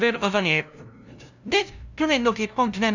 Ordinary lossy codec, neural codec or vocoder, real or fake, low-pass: none; codec, 16 kHz, 0.5 kbps, FunCodec, trained on LibriTTS, 25 frames a second; fake; 7.2 kHz